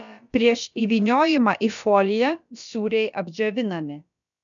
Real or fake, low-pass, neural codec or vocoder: fake; 7.2 kHz; codec, 16 kHz, about 1 kbps, DyCAST, with the encoder's durations